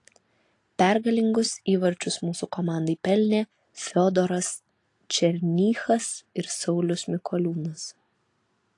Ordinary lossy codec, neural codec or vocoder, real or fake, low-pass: AAC, 48 kbps; none; real; 10.8 kHz